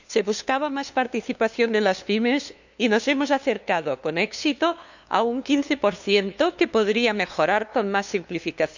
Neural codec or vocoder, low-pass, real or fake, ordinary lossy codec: codec, 16 kHz, 2 kbps, FunCodec, trained on LibriTTS, 25 frames a second; 7.2 kHz; fake; none